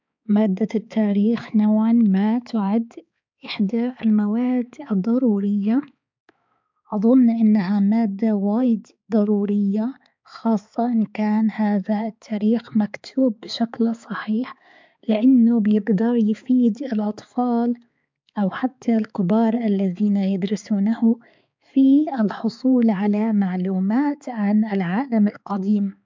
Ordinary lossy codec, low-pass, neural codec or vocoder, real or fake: MP3, 64 kbps; 7.2 kHz; codec, 16 kHz, 4 kbps, X-Codec, HuBERT features, trained on balanced general audio; fake